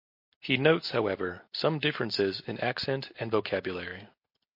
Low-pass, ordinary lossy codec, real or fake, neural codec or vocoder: 5.4 kHz; MP3, 32 kbps; real; none